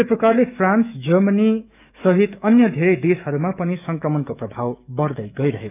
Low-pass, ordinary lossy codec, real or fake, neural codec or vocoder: 3.6 kHz; none; fake; autoencoder, 48 kHz, 128 numbers a frame, DAC-VAE, trained on Japanese speech